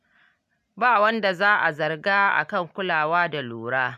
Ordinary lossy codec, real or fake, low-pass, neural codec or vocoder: none; real; 14.4 kHz; none